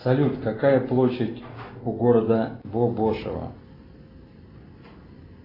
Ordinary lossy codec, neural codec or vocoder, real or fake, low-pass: AAC, 24 kbps; none; real; 5.4 kHz